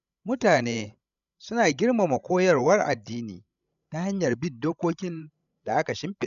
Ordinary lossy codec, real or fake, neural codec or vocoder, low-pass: none; fake; codec, 16 kHz, 16 kbps, FreqCodec, larger model; 7.2 kHz